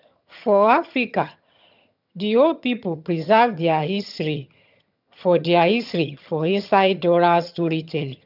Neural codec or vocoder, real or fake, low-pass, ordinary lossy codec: vocoder, 22.05 kHz, 80 mel bands, HiFi-GAN; fake; 5.4 kHz; none